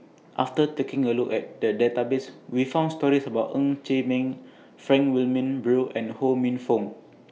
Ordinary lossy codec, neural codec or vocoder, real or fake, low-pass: none; none; real; none